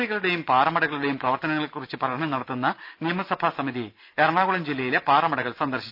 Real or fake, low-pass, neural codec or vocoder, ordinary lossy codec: real; 5.4 kHz; none; none